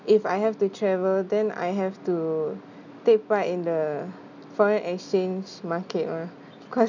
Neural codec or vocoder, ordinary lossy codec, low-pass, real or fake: none; none; 7.2 kHz; real